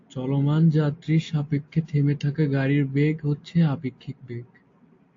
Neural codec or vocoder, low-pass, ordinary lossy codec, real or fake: none; 7.2 kHz; AAC, 48 kbps; real